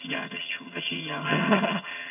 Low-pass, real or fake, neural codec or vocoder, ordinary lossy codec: 3.6 kHz; fake; vocoder, 22.05 kHz, 80 mel bands, HiFi-GAN; none